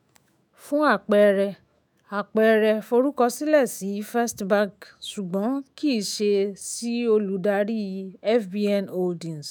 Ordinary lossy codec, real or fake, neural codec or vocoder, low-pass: none; fake; autoencoder, 48 kHz, 128 numbers a frame, DAC-VAE, trained on Japanese speech; none